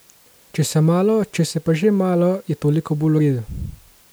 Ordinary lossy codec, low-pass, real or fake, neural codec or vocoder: none; none; real; none